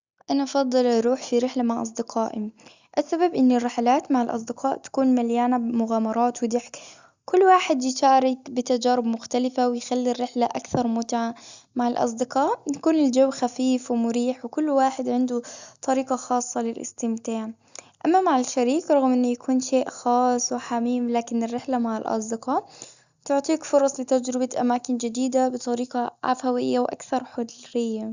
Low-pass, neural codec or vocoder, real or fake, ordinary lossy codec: 7.2 kHz; none; real; Opus, 64 kbps